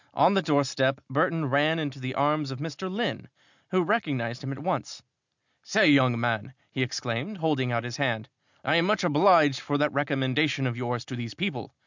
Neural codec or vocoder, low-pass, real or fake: none; 7.2 kHz; real